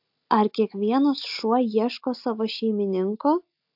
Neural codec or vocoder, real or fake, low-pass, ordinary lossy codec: none; real; 5.4 kHz; AAC, 48 kbps